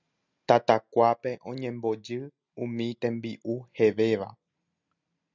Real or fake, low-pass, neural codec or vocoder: real; 7.2 kHz; none